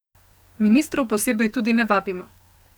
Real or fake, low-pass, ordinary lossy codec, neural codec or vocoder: fake; none; none; codec, 44.1 kHz, 2.6 kbps, SNAC